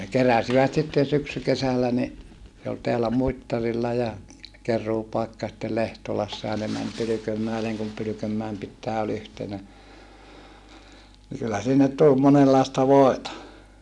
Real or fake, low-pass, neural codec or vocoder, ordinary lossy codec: real; none; none; none